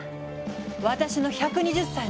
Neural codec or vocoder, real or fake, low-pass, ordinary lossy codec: none; real; none; none